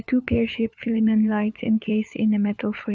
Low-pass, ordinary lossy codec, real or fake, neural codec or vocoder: none; none; fake; codec, 16 kHz, 4 kbps, FunCodec, trained on LibriTTS, 50 frames a second